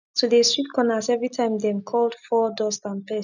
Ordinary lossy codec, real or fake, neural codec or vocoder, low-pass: none; real; none; 7.2 kHz